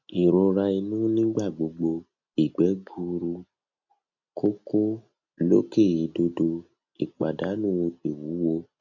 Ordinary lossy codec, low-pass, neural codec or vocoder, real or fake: none; 7.2 kHz; none; real